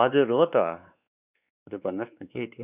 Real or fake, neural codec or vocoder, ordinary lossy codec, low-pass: fake; codec, 16 kHz, 2 kbps, X-Codec, WavLM features, trained on Multilingual LibriSpeech; none; 3.6 kHz